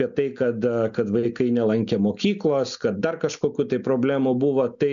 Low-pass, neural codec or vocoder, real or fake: 7.2 kHz; none; real